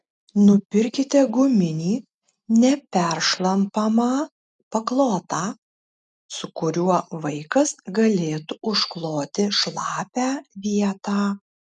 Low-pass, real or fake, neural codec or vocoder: 10.8 kHz; real; none